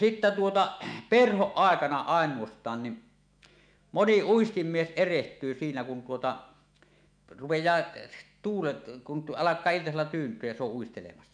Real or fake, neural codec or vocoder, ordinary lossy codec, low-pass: fake; autoencoder, 48 kHz, 128 numbers a frame, DAC-VAE, trained on Japanese speech; none; 9.9 kHz